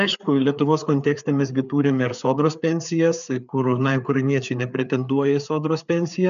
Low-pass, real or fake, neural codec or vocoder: 7.2 kHz; fake; codec, 16 kHz, 4 kbps, FreqCodec, larger model